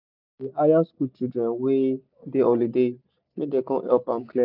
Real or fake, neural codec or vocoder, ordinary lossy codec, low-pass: real; none; none; 5.4 kHz